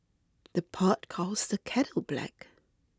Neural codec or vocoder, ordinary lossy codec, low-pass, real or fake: none; none; none; real